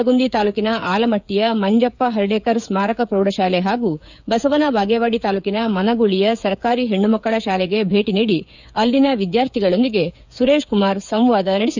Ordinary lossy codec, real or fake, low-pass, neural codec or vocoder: none; fake; 7.2 kHz; codec, 16 kHz, 8 kbps, FreqCodec, smaller model